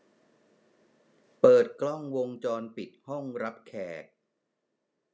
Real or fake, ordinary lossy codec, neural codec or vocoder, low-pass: real; none; none; none